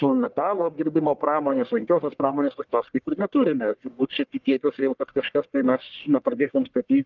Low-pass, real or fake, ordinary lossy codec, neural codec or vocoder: 7.2 kHz; fake; Opus, 24 kbps; codec, 44.1 kHz, 1.7 kbps, Pupu-Codec